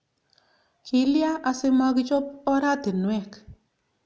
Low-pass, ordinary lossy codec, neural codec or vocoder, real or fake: none; none; none; real